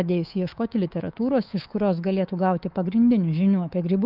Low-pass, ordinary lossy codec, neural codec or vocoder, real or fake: 5.4 kHz; Opus, 24 kbps; codec, 24 kHz, 3.1 kbps, DualCodec; fake